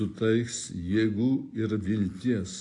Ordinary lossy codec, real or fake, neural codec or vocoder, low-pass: AAC, 48 kbps; real; none; 10.8 kHz